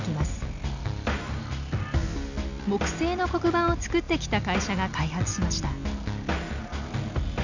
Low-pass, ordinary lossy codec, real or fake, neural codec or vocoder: 7.2 kHz; none; real; none